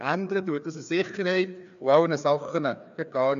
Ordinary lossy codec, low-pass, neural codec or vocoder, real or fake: none; 7.2 kHz; codec, 16 kHz, 2 kbps, FreqCodec, larger model; fake